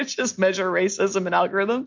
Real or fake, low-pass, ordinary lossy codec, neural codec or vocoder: real; 7.2 kHz; MP3, 64 kbps; none